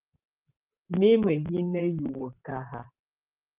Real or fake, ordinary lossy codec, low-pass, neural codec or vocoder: fake; Opus, 32 kbps; 3.6 kHz; vocoder, 44.1 kHz, 128 mel bands, Pupu-Vocoder